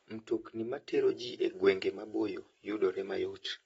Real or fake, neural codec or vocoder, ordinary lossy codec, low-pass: real; none; AAC, 24 kbps; 19.8 kHz